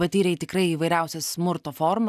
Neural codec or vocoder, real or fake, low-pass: none; real; 14.4 kHz